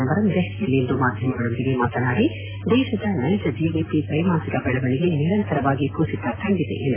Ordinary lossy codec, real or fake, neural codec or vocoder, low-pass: AAC, 16 kbps; real; none; 3.6 kHz